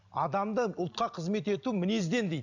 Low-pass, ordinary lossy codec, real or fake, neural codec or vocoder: 7.2 kHz; none; real; none